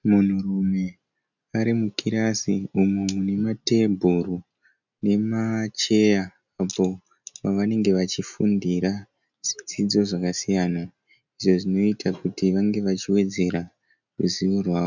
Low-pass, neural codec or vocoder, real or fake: 7.2 kHz; none; real